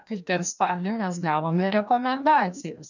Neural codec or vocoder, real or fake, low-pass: codec, 16 kHz, 1 kbps, FreqCodec, larger model; fake; 7.2 kHz